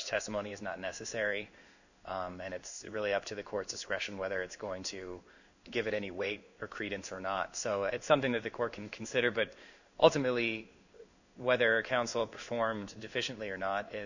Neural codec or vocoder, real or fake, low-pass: codec, 16 kHz in and 24 kHz out, 1 kbps, XY-Tokenizer; fake; 7.2 kHz